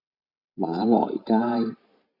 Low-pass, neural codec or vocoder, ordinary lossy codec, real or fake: 5.4 kHz; vocoder, 44.1 kHz, 128 mel bands every 512 samples, BigVGAN v2; MP3, 48 kbps; fake